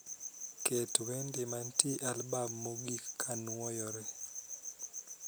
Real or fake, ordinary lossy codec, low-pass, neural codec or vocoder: real; none; none; none